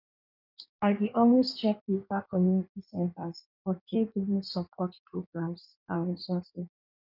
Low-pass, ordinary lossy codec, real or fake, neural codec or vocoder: 5.4 kHz; none; fake; codec, 16 kHz in and 24 kHz out, 1.1 kbps, FireRedTTS-2 codec